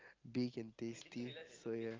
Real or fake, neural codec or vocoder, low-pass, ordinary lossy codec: real; none; 7.2 kHz; Opus, 32 kbps